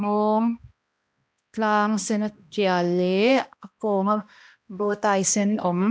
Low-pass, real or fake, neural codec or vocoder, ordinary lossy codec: none; fake; codec, 16 kHz, 1 kbps, X-Codec, HuBERT features, trained on balanced general audio; none